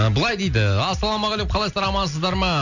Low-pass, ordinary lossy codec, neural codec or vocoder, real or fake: 7.2 kHz; none; none; real